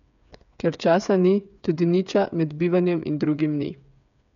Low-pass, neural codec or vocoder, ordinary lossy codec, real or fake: 7.2 kHz; codec, 16 kHz, 8 kbps, FreqCodec, smaller model; none; fake